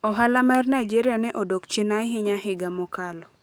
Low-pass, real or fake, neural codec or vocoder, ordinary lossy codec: none; fake; codec, 44.1 kHz, 7.8 kbps, DAC; none